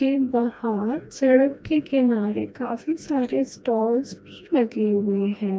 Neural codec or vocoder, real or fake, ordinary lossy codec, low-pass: codec, 16 kHz, 1 kbps, FreqCodec, smaller model; fake; none; none